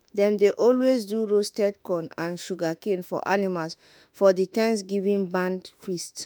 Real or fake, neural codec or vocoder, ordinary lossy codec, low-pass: fake; autoencoder, 48 kHz, 32 numbers a frame, DAC-VAE, trained on Japanese speech; none; none